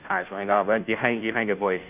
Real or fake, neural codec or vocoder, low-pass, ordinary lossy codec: fake; codec, 16 kHz, 0.5 kbps, FunCodec, trained on Chinese and English, 25 frames a second; 3.6 kHz; none